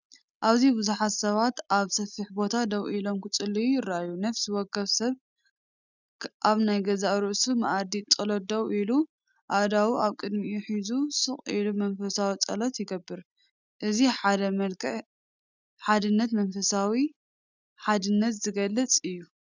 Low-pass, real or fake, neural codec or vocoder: 7.2 kHz; real; none